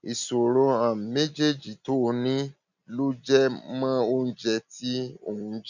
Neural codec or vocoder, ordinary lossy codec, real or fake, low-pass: none; none; real; 7.2 kHz